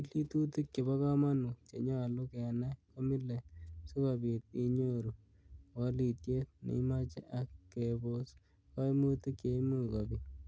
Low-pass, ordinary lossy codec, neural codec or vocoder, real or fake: none; none; none; real